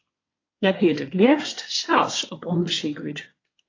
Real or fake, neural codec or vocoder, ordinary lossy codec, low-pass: fake; codec, 24 kHz, 1 kbps, SNAC; AAC, 32 kbps; 7.2 kHz